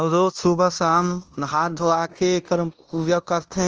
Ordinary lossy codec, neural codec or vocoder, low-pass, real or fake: Opus, 24 kbps; codec, 16 kHz in and 24 kHz out, 0.9 kbps, LongCat-Audio-Codec, fine tuned four codebook decoder; 7.2 kHz; fake